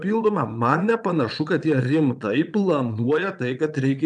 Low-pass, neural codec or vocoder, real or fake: 9.9 kHz; vocoder, 22.05 kHz, 80 mel bands, Vocos; fake